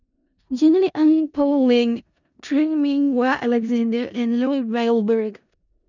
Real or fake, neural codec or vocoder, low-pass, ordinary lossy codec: fake; codec, 16 kHz in and 24 kHz out, 0.4 kbps, LongCat-Audio-Codec, four codebook decoder; 7.2 kHz; none